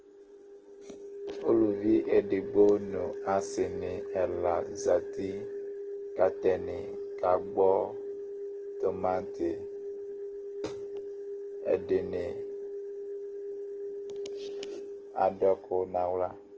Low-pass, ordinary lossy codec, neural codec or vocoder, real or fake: 7.2 kHz; Opus, 16 kbps; none; real